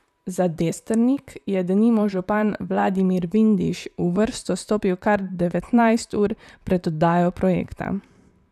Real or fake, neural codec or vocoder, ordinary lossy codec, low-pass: fake; vocoder, 44.1 kHz, 128 mel bands, Pupu-Vocoder; none; 14.4 kHz